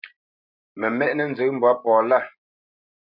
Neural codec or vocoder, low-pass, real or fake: none; 5.4 kHz; real